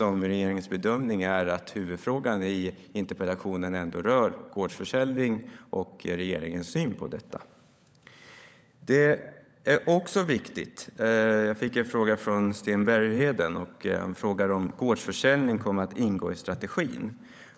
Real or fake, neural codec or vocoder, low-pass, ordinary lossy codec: fake; codec, 16 kHz, 16 kbps, FunCodec, trained on LibriTTS, 50 frames a second; none; none